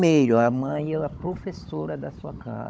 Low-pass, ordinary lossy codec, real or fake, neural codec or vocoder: none; none; fake; codec, 16 kHz, 16 kbps, FunCodec, trained on Chinese and English, 50 frames a second